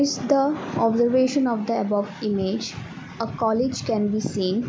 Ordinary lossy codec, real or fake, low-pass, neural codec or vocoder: none; real; none; none